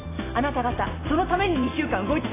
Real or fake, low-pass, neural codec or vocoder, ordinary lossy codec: real; 3.6 kHz; none; AAC, 16 kbps